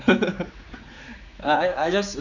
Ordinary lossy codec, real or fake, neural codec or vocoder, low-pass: none; fake; codec, 16 kHz, 4 kbps, X-Codec, HuBERT features, trained on general audio; 7.2 kHz